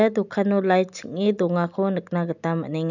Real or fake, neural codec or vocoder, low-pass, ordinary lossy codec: real; none; 7.2 kHz; none